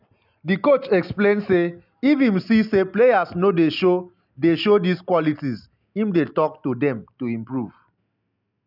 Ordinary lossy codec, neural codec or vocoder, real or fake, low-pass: none; none; real; 5.4 kHz